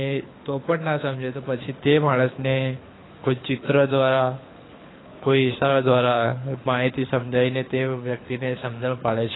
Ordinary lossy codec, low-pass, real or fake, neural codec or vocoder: AAC, 16 kbps; 7.2 kHz; fake; codec, 24 kHz, 6 kbps, HILCodec